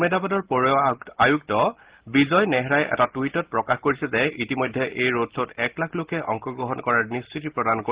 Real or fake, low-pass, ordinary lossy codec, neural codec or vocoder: real; 3.6 kHz; Opus, 16 kbps; none